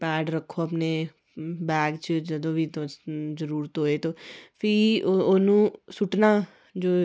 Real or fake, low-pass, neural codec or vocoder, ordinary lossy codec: real; none; none; none